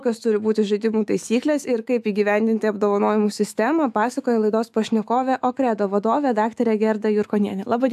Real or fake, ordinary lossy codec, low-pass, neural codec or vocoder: fake; AAC, 96 kbps; 14.4 kHz; autoencoder, 48 kHz, 128 numbers a frame, DAC-VAE, trained on Japanese speech